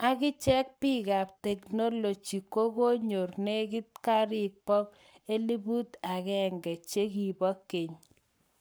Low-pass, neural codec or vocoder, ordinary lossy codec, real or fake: none; codec, 44.1 kHz, 7.8 kbps, Pupu-Codec; none; fake